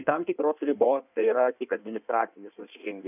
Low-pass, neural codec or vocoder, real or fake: 3.6 kHz; codec, 16 kHz in and 24 kHz out, 1.1 kbps, FireRedTTS-2 codec; fake